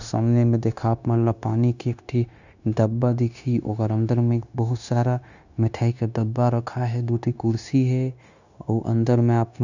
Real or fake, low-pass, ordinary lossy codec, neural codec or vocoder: fake; 7.2 kHz; none; codec, 16 kHz, 0.9 kbps, LongCat-Audio-Codec